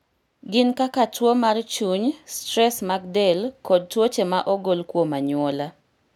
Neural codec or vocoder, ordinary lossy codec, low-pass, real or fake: none; none; 19.8 kHz; real